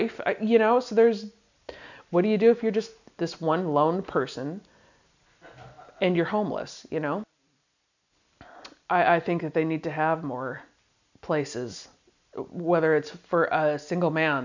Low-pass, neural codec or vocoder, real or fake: 7.2 kHz; none; real